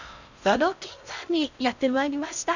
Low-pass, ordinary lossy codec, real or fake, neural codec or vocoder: 7.2 kHz; none; fake; codec, 16 kHz in and 24 kHz out, 0.6 kbps, FocalCodec, streaming, 4096 codes